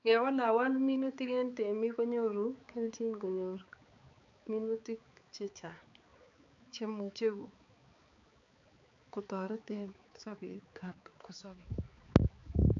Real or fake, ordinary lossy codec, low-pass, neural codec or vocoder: fake; AAC, 64 kbps; 7.2 kHz; codec, 16 kHz, 4 kbps, X-Codec, HuBERT features, trained on balanced general audio